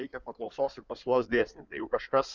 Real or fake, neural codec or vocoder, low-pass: fake; codec, 16 kHz in and 24 kHz out, 2.2 kbps, FireRedTTS-2 codec; 7.2 kHz